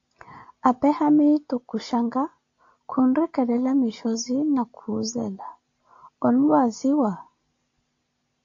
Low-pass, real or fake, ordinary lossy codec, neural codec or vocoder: 7.2 kHz; real; AAC, 48 kbps; none